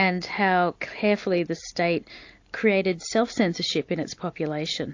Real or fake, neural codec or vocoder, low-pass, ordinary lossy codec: real; none; 7.2 kHz; AAC, 48 kbps